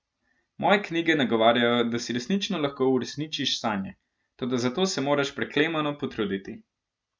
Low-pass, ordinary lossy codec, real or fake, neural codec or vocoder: none; none; real; none